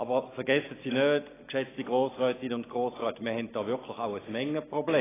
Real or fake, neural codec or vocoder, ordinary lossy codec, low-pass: real; none; AAC, 16 kbps; 3.6 kHz